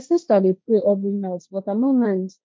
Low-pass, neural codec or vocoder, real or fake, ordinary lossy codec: none; codec, 16 kHz, 1.1 kbps, Voila-Tokenizer; fake; none